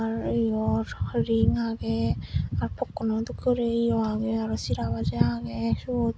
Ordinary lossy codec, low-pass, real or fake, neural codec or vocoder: none; none; real; none